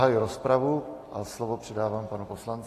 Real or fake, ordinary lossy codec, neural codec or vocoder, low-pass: real; AAC, 48 kbps; none; 14.4 kHz